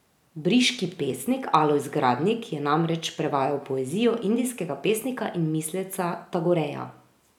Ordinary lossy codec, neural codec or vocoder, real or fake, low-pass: none; none; real; 19.8 kHz